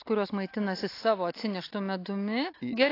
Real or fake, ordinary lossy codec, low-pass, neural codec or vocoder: real; AAC, 32 kbps; 5.4 kHz; none